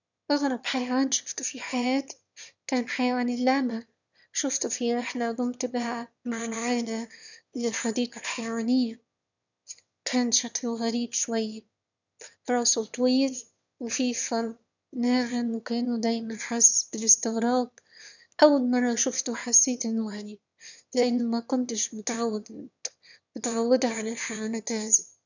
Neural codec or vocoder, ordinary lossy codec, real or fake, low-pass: autoencoder, 22.05 kHz, a latent of 192 numbers a frame, VITS, trained on one speaker; none; fake; 7.2 kHz